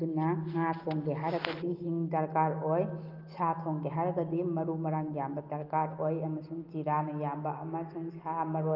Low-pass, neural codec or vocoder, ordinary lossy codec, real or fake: 5.4 kHz; none; Opus, 32 kbps; real